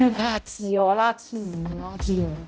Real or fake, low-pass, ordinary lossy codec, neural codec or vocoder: fake; none; none; codec, 16 kHz, 0.5 kbps, X-Codec, HuBERT features, trained on balanced general audio